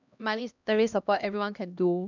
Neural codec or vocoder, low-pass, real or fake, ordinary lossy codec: codec, 16 kHz, 1 kbps, X-Codec, HuBERT features, trained on LibriSpeech; 7.2 kHz; fake; none